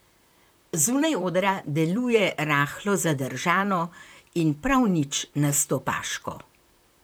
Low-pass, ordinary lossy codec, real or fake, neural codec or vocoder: none; none; fake; vocoder, 44.1 kHz, 128 mel bands, Pupu-Vocoder